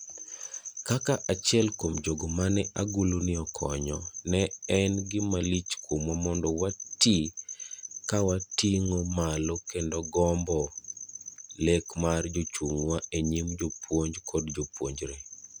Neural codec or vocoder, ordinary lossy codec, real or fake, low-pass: none; none; real; none